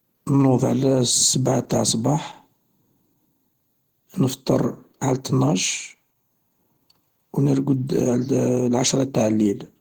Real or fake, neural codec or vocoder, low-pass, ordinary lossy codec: fake; vocoder, 48 kHz, 128 mel bands, Vocos; 19.8 kHz; Opus, 16 kbps